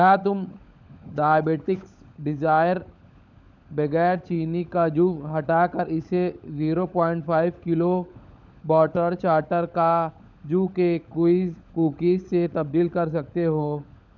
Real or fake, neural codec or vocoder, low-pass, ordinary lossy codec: fake; codec, 16 kHz, 16 kbps, FunCodec, trained on LibriTTS, 50 frames a second; 7.2 kHz; none